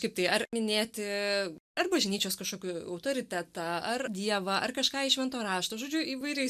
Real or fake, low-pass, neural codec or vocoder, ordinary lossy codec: real; 14.4 kHz; none; MP3, 96 kbps